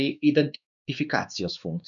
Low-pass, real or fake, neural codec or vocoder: 7.2 kHz; fake; codec, 16 kHz, 2 kbps, X-Codec, WavLM features, trained on Multilingual LibriSpeech